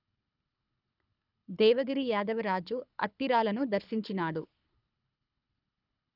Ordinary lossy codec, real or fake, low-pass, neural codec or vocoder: none; fake; 5.4 kHz; codec, 24 kHz, 6 kbps, HILCodec